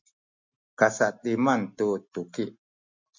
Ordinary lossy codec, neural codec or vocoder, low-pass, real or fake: MP3, 48 kbps; none; 7.2 kHz; real